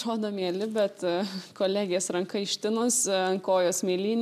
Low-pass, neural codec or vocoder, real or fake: 14.4 kHz; none; real